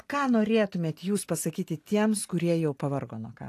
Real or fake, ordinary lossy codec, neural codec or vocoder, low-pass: real; AAC, 64 kbps; none; 14.4 kHz